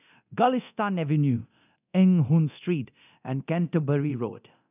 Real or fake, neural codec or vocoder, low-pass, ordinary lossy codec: fake; codec, 24 kHz, 0.9 kbps, DualCodec; 3.6 kHz; none